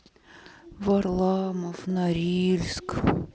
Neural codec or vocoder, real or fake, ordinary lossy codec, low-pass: none; real; none; none